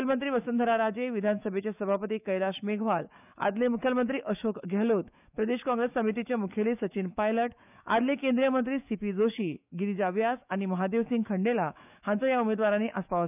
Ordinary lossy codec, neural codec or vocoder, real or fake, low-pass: none; autoencoder, 48 kHz, 128 numbers a frame, DAC-VAE, trained on Japanese speech; fake; 3.6 kHz